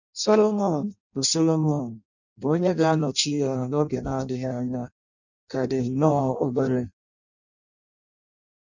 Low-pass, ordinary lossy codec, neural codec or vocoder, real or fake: 7.2 kHz; none; codec, 16 kHz in and 24 kHz out, 0.6 kbps, FireRedTTS-2 codec; fake